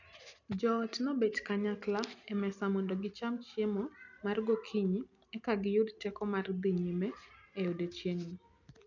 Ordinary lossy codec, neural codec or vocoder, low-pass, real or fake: none; none; 7.2 kHz; real